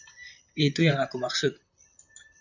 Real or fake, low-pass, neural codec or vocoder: fake; 7.2 kHz; vocoder, 44.1 kHz, 128 mel bands, Pupu-Vocoder